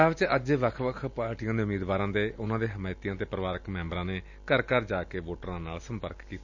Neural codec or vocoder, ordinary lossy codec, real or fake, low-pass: none; none; real; 7.2 kHz